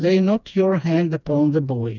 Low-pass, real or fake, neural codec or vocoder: 7.2 kHz; fake; codec, 16 kHz, 2 kbps, FreqCodec, smaller model